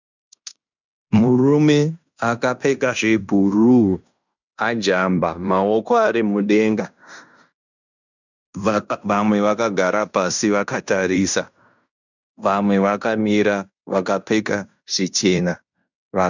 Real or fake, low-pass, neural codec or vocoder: fake; 7.2 kHz; codec, 16 kHz in and 24 kHz out, 0.9 kbps, LongCat-Audio-Codec, fine tuned four codebook decoder